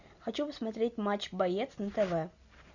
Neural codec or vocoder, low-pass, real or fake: none; 7.2 kHz; real